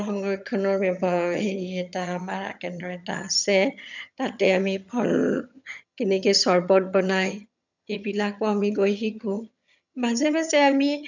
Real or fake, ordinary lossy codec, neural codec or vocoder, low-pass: fake; none; vocoder, 22.05 kHz, 80 mel bands, HiFi-GAN; 7.2 kHz